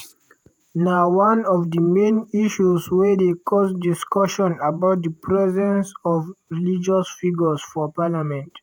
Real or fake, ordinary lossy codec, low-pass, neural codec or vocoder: fake; none; none; vocoder, 48 kHz, 128 mel bands, Vocos